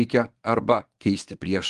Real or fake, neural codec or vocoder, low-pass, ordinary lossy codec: fake; codec, 24 kHz, 0.9 kbps, WavTokenizer, medium speech release version 1; 10.8 kHz; Opus, 24 kbps